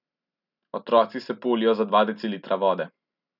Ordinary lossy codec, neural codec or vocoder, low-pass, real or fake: none; none; 5.4 kHz; real